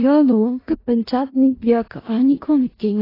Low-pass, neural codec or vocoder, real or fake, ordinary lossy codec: 5.4 kHz; codec, 16 kHz in and 24 kHz out, 0.4 kbps, LongCat-Audio-Codec, four codebook decoder; fake; AAC, 24 kbps